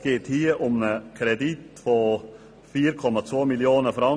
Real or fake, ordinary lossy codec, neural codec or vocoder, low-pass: real; none; none; none